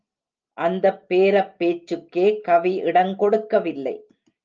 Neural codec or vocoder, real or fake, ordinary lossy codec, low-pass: none; real; Opus, 24 kbps; 7.2 kHz